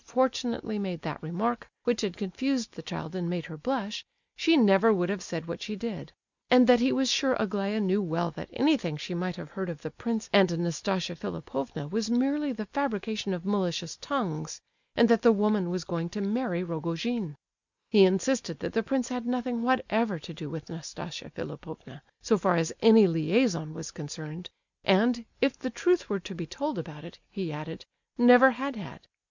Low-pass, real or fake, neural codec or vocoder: 7.2 kHz; real; none